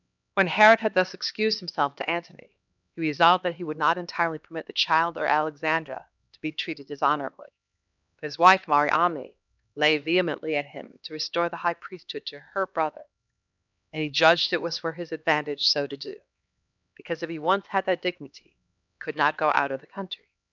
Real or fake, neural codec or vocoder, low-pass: fake; codec, 16 kHz, 2 kbps, X-Codec, HuBERT features, trained on LibriSpeech; 7.2 kHz